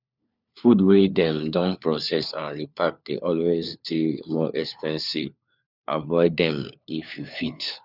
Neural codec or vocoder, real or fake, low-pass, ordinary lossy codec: codec, 16 kHz, 4 kbps, FunCodec, trained on LibriTTS, 50 frames a second; fake; 5.4 kHz; none